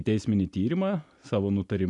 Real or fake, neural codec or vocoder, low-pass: real; none; 10.8 kHz